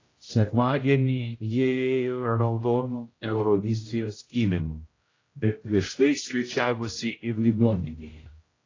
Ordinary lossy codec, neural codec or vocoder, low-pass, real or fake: AAC, 32 kbps; codec, 16 kHz, 0.5 kbps, X-Codec, HuBERT features, trained on general audio; 7.2 kHz; fake